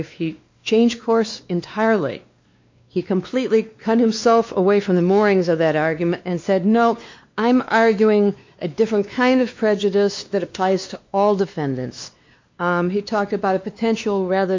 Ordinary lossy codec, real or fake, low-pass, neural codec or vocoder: MP3, 64 kbps; fake; 7.2 kHz; codec, 16 kHz, 2 kbps, X-Codec, WavLM features, trained on Multilingual LibriSpeech